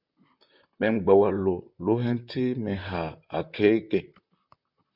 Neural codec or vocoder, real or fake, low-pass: vocoder, 44.1 kHz, 128 mel bands, Pupu-Vocoder; fake; 5.4 kHz